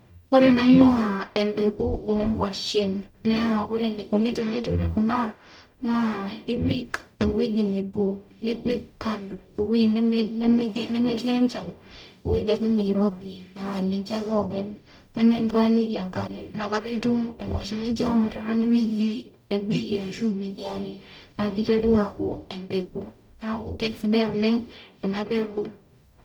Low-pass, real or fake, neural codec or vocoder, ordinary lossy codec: 19.8 kHz; fake; codec, 44.1 kHz, 0.9 kbps, DAC; none